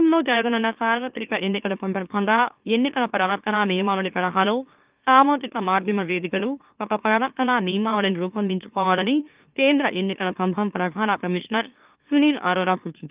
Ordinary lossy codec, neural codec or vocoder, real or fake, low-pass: Opus, 24 kbps; autoencoder, 44.1 kHz, a latent of 192 numbers a frame, MeloTTS; fake; 3.6 kHz